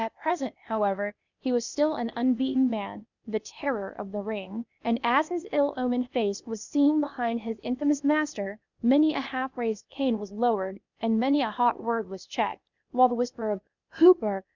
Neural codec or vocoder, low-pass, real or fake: codec, 16 kHz, 0.8 kbps, ZipCodec; 7.2 kHz; fake